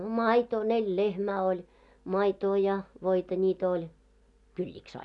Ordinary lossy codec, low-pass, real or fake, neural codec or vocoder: none; 10.8 kHz; real; none